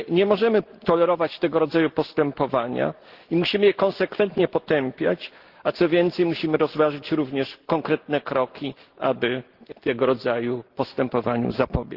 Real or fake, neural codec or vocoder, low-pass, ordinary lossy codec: real; none; 5.4 kHz; Opus, 16 kbps